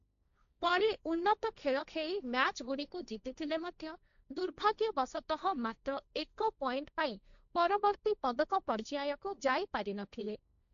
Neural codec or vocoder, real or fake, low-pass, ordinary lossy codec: codec, 16 kHz, 1.1 kbps, Voila-Tokenizer; fake; 7.2 kHz; none